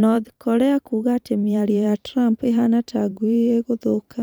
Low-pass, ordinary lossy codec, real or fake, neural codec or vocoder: none; none; fake; vocoder, 44.1 kHz, 128 mel bands every 512 samples, BigVGAN v2